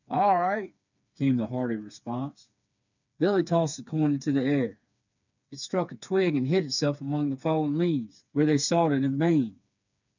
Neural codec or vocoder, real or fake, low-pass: codec, 16 kHz, 4 kbps, FreqCodec, smaller model; fake; 7.2 kHz